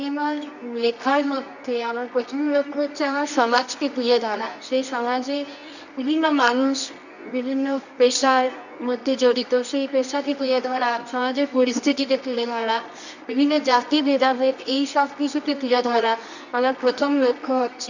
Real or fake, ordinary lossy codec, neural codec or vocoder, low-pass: fake; none; codec, 24 kHz, 0.9 kbps, WavTokenizer, medium music audio release; 7.2 kHz